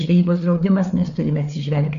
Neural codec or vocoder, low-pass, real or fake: codec, 16 kHz, 4 kbps, FreqCodec, larger model; 7.2 kHz; fake